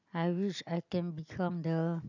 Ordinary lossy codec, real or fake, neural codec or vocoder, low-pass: none; real; none; 7.2 kHz